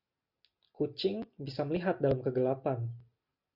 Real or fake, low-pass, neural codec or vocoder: real; 5.4 kHz; none